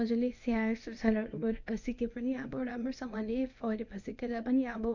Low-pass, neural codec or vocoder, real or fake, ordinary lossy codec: 7.2 kHz; codec, 24 kHz, 0.9 kbps, WavTokenizer, medium speech release version 1; fake; none